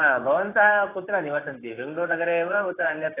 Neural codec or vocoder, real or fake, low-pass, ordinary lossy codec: codec, 16 kHz, 6 kbps, DAC; fake; 3.6 kHz; AAC, 16 kbps